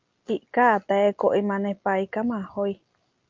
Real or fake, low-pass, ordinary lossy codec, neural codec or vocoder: real; 7.2 kHz; Opus, 24 kbps; none